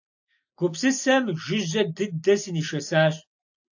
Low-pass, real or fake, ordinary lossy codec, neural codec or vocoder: 7.2 kHz; real; AAC, 48 kbps; none